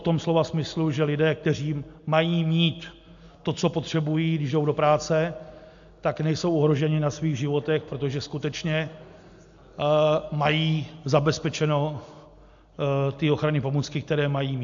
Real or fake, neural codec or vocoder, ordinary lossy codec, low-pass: real; none; AAC, 64 kbps; 7.2 kHz